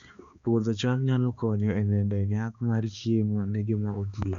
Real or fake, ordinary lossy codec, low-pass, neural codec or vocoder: fake; Opus, 64 kbps; 7.2 kHz; codec, 16 kHz, 2 kbps, X-Codec, HuBERT features, trained on balanced general audio